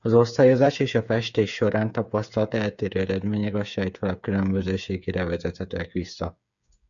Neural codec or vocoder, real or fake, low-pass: codec, 16 kHz, 8 kbps, FreqCodec, smaller model; fake; 7.2 kHz